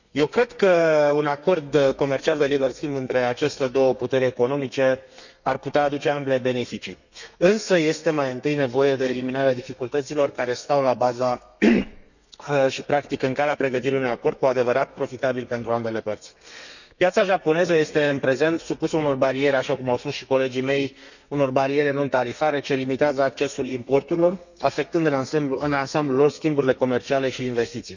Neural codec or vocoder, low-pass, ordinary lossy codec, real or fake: codec, 32 kHz, 1.9 kbps, SNAC; 7.2 kHz; none; fake